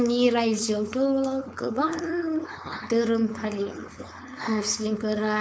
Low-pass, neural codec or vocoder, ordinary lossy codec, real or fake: none; codec, 16 kHz, 4.8 kbps, FACodec; none; fake